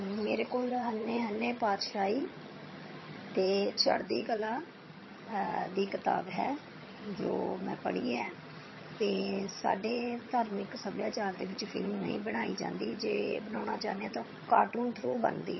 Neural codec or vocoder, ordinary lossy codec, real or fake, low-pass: vocoder, 22.05 kHz, 80 mel bands, HiFi-GAN; MP3, 24 kbps; fake; 7.2 kHz